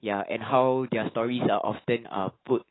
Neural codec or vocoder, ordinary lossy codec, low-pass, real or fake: autoencoder, 48 kHz, 128 numbers a frame, DAC-VAE, trained on Japanese speech; AAC, 16 kbps; 7.2 kHz; fake